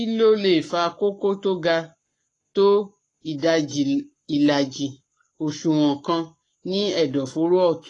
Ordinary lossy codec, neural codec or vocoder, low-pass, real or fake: AAC, 32 kbps; codec, 44.1 kHz, 7.8 kbps, Pupu-Codec; 10.8 kHz; fake